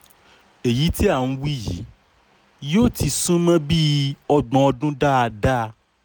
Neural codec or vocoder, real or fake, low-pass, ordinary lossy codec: none; real; none; none